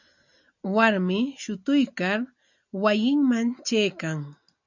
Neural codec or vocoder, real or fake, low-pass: none; real; 7.2 kHz